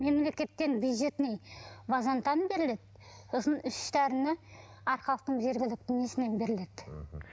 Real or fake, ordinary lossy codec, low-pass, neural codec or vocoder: real; none; none; none